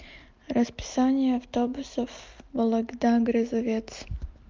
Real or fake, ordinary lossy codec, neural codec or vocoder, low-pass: real; Opus, 32 kbps; none; 7.2 kHz